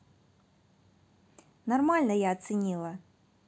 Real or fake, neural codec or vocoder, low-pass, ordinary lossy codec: real; none; none; none